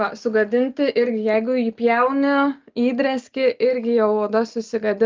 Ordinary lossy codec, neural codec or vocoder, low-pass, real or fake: Opus, 24 kbps; none; 7.2 kHz; real